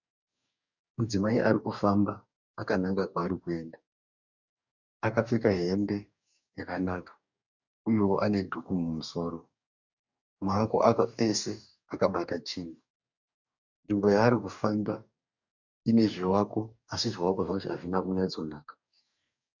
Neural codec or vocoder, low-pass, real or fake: codec, 44.1 kHz, 2.6 kbps, DAC; 7.2 kHz; fake